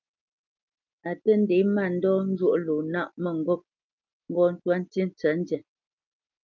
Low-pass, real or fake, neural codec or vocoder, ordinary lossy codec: 7.2 kHz; real; none; Opus, 24 kbps